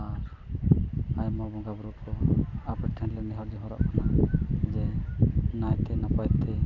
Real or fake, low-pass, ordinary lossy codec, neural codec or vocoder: real; 7.2 kHz; none; none